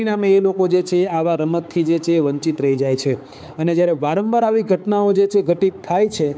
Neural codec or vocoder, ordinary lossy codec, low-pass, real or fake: codec, 16 kHz, 4 kbps, X-Codec, HuBERT features, trained on balanced general audio; none; none; fake